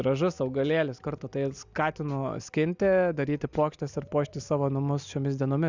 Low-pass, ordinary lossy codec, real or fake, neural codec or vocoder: 7.2 kHz; Opus, 64 kbps; real; none